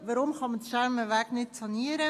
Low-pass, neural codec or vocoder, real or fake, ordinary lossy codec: 14.4 kHz; none; real; AAC, 64 kbps